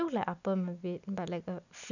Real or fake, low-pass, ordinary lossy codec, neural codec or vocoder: real; 7.2 kHz; none; none